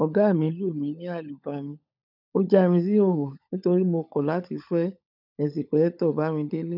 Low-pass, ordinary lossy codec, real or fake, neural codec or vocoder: 5.4 kHz; none; fake; codec, 16 kHz, 16 kbps, FunCodec, trained on LibriTTS, 50 frames a second